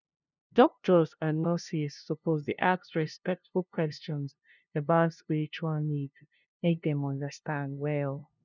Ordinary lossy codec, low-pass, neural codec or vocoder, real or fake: none; 7.2 kHz; codec, 16 kHz, 0.5 kbps, FunCodec, trained on LibriTTS, 25 frames a second; fake